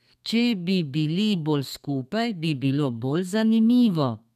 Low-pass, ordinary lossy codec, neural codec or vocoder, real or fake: 14.4 kHz; none; codec, 32 kHz, 1.9 kbps, SNAC; fake